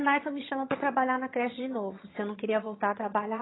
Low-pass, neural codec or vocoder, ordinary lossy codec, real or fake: 7.2 kHz; vocoder, 22.05 kHz, 80 mel bands, HiFi-GAN; AAC, 16 kbps; fake